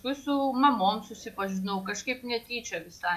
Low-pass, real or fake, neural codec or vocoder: 14.4 kHz; real; none